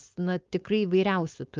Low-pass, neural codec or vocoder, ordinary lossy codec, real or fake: 7.2 kHz; codec, 16 kHz, 4.8 kbps, FACodec; Opus, 32 kbps; fake